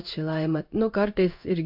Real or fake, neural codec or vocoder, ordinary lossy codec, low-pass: fake; codec, 16 kHz in and 24 kHz out, 1 kbps, XY-Tokenizer; MP3, 48 kbps; 5.4 kHz